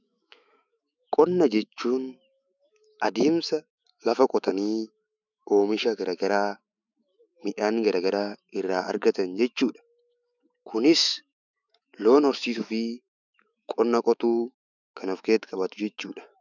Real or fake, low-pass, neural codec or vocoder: fake; 7.2 kHz; autoencoder, 48 kHz, 128 numbers a frame, DAC-VAE, trained on Japanese speech